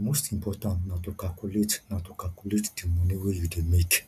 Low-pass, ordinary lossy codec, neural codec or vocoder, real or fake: 14.4 kHz; none; none; real